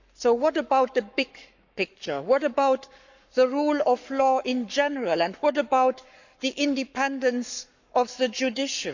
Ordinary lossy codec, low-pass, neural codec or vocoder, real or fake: none; 7.2 kHz; codec, 44.1 kHz, 7.8 kbps, Pupu-Codec; fake